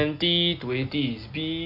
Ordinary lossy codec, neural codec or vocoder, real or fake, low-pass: MP3, 48 kbps; none; real; 5.4 kHz